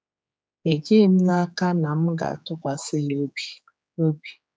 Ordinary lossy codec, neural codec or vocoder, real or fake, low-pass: none; codec, 16 kHz, 4 kbps, X-Codec, HuBERT features, trained on general audio; fake; none